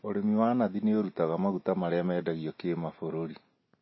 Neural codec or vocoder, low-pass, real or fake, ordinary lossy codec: none; 7.2 kHz; real; MP3, 24 kbps